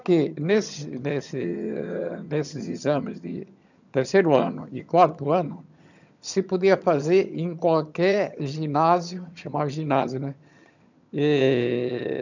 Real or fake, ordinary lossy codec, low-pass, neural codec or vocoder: fake; none; 7.2 kHz; vocoder, 22.05 kHz, 80 mel bands, HiFi-GAN